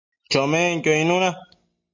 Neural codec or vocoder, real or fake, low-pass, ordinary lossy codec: none; real; 7.2 kHz; MP3, 48 kbps